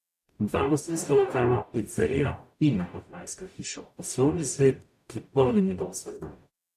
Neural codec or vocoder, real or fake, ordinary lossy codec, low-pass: codec, 44.1 kHz, 0.9 kbps, DAC; fake; AAC, 96 kbps; 14.4 kHz